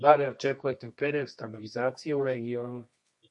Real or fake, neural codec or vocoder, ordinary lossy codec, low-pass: fake; codec, 24 kHz, 0.9 kbps, WavTokenizer, medium music audio release; MP3, 48 kbps; 10.8 kHz